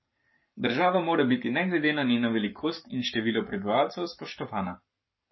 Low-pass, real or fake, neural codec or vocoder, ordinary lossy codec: 7.2 kHz; fake; codec, 44.1 kHz, 7.8 kbps, Pupu-Codec; MP3, 24 kbps